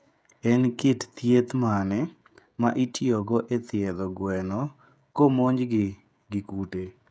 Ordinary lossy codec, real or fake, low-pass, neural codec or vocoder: none; fake; none; codec, 16 kHz, 16 kbps, FreqCodec, smaller model